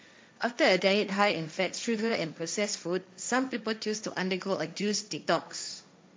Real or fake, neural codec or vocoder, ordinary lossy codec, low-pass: fake; codec, 16 kHz, 1.1 kbps, Voila-Tokenizer; none; none